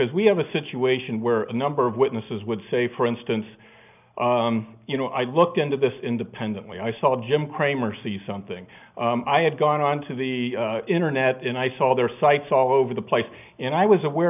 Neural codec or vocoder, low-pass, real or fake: none; 3.6 kHz; real